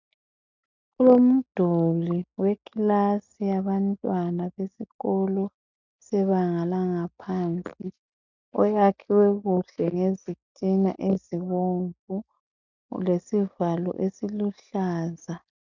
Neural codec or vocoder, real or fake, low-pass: none; real; 7.2 kHz